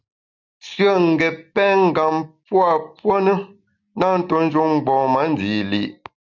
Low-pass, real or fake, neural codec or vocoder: 7.2 kHz; real; none